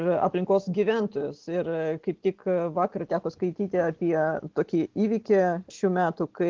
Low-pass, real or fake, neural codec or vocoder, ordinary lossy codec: 7.2 kHz; real; none; Opus, 16 kbps